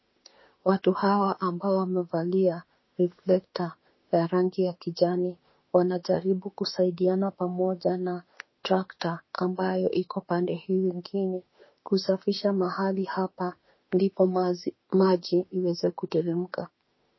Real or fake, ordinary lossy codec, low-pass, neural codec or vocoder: fake; MP3, 24 kbps; 7.2 kHz; autoencoder, 48 kHz, 32 numbers a frame, DAC-VAE, trained on Japanese speech